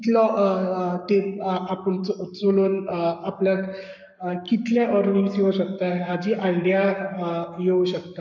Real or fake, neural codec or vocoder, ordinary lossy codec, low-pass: fake; codec, 44.1 kHz, 7.8 kbps, Pupu-Codec; none; 7.2 kHz